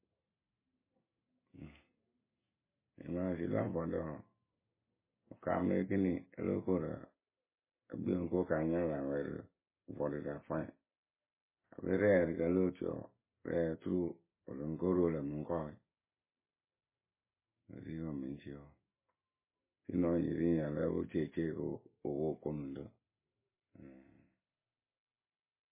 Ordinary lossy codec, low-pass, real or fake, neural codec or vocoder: MP3, 16 kbps; 3.6 kHz; real; none